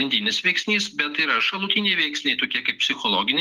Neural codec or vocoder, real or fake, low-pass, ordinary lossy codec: none; real; 14.4 kHz; Opus, 24 kbps